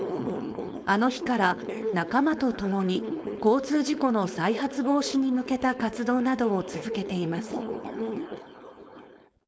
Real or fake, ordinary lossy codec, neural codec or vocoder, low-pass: fake; none; codec, 16 kHz, 4.8 kbps, FACodec; none